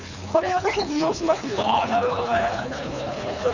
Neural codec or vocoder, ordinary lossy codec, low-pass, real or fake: codec, 24 kHz, 3 kbps, HILCodec; none; 7.2 kHz; fake